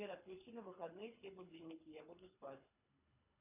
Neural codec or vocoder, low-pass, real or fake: codec, 24 kHz, 3 kbps, HILCodec; 3.6 kHz; fake